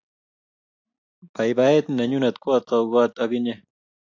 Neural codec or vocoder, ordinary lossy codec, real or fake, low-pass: none; AAC, 48 kbps; real; 7.2 kHz